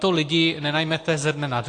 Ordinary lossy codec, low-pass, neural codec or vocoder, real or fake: AAC, 48 kbps; 9.9 kHz; none; real